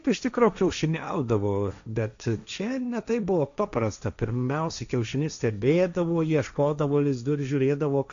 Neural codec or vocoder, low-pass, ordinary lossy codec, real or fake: codec, 16 kHz, 1.1 kbps, Voila-Tokenizer; 7.2 kHz; MP3, 64 kbps; fake